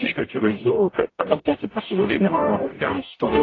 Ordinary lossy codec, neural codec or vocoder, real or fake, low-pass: AAC, 32 kbps; codec, 44.1 kHz, 0.9 kbps, DAC; fake; 7.2 kHz